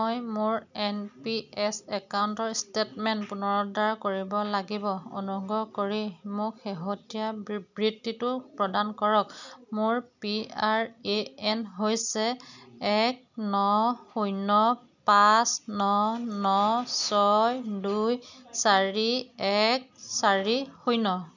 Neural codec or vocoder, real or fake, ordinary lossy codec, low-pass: none; real; none; 7.2 kHz